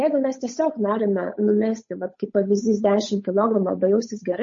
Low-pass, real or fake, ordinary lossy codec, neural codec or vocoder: 7.2 kHz; fake; MP3, 32 kbps; codec, 16 kHz, 8 kbps, FunCodec, trained on Chinese and English, 25 frames a second